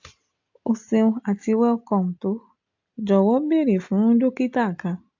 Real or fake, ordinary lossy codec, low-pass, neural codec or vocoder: real; AAC, 48 kbps; 7.2 kHz; none